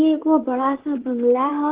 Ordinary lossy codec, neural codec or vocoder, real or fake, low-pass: Opus, 16 kbps; vocoder, 44.1 kHz, 128 mel bands, Pupu-Vocoder; fake; 3.6 kHz